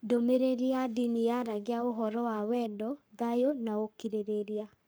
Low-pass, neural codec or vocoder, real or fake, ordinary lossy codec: none; codec, 44.1 kHz, 7.8 kbps, Pupu-Codec; fake; none